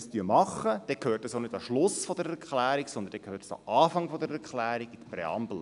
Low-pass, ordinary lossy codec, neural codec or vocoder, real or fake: 10.8 kHz; none; none; real